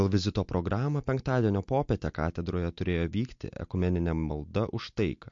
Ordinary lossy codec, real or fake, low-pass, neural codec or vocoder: MP3, 48 kbps; real; 7.2 kHz; none